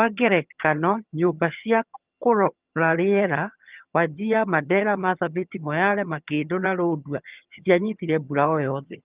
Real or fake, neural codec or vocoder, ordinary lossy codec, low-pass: fake; vocoder, 22.05 kHz, 80 mel bands, HiFi-GAN; Opus, 32 kbps; 3.6 kHz